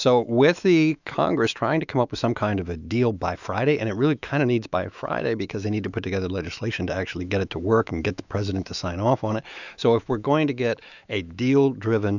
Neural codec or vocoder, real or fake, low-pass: autoencoder, 48 kHz, 128 numbers a frame, DAC-VAE, trained on Japanese speech; fake; 7.2 kHz